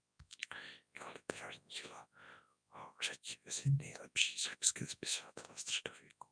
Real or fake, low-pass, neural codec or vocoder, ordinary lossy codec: fake; 9.9 kHz; codec, 24 kHz, 0.9 kbps, WavTokenizer, large speech release; MP3, 96 kbps